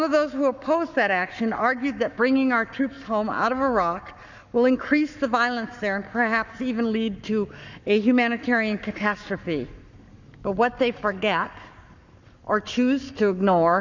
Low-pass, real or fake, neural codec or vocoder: 7.2 kHz; fake; codec, 44.1 kHz, 7.8 kbps, Pupu-Codec